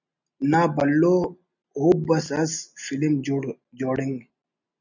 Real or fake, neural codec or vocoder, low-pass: real; none; 7.2 kHz